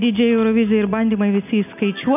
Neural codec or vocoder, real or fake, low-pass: none; real; 3.6 kHz